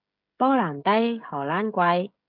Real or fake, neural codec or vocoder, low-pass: fake; codec, 16 kHz, 16 kbps, FreqCodec, smaller model; 5.4 kHz